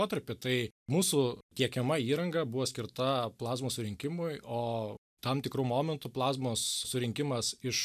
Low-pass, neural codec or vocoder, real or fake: 14.4 kHz; none; real